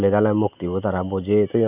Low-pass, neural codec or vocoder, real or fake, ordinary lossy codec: 3.6 kHz; none; real; none